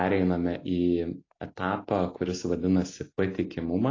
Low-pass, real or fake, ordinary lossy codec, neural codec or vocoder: 7.2 kHz; real; AAC, 32 kbps; none